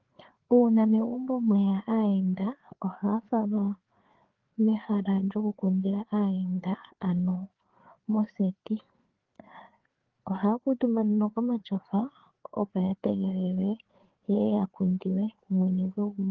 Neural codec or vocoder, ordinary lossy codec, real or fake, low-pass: codec, 16 kHz, 4 kbps, FreqCodec, larger model; Opus, 16 kbps; fake; 7.2 kHz